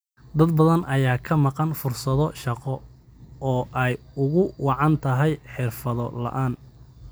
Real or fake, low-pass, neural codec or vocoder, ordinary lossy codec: real; none; none; none